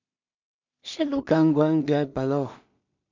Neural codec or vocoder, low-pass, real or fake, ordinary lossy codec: codec, 16 kHz in and 24 kHz out, 0.4 kbps, LongCat-Audio-Codec, two codebook decoder; 7.2 kHz; fake; MP3, 64 kbps